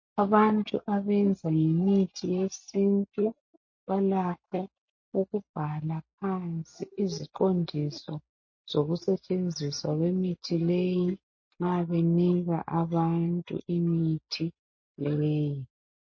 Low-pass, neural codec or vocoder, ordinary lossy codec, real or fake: 7.2 kHz; none; MP3, 32 kbps; real